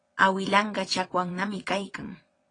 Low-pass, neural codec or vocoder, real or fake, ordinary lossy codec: 9.9 kHz; vocoder, 22.05 kHz, 80 mel bands, WaveNeXt; fake; AAC, 32 kbps